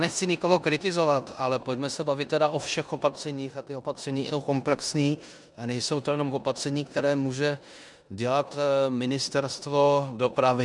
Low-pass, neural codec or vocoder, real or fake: 10.8 kHz; codec, 16 kHz in and 24 kHz out, 0.9 kbps, LongCat-Audio-Codec, four codebook decoder; fake